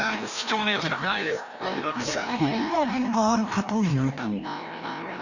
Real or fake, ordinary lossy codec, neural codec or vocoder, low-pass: fake; none; codec, 16 kHz, 1 kbps, FreqCodec, larger model; 7.2 kHz